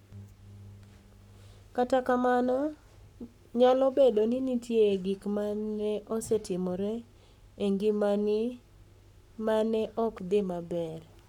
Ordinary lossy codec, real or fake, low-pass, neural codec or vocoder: none; fake; 19.8 kHz; codec, 44.1 kHz, 7.8 kbps, Pupu-Codec